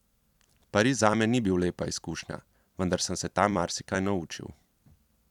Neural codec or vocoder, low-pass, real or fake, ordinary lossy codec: vocoder, 44.1 kHz, 128 mel bands every 256 samples, BigVGAN v2; 19.8 kHz; fake; none